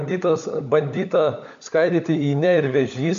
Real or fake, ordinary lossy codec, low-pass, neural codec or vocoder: fake; MP3, 64 kbps; 7.2 kHz; codec, 16 kHz, 16 kbps, FunCodec, trained on LibriTTS, 50 frames a second